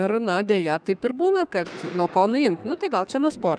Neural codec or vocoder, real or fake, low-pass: codec, 32 kHz, 1.9 kbps, SNAC; fake; 9.9 kHz